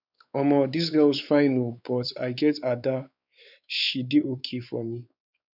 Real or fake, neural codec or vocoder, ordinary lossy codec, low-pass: fake; codec, 16 kHz, 4 kbps, X-Codec, WavLM features, trained on Multilingual LibriSpeech; none; 5.4 kHz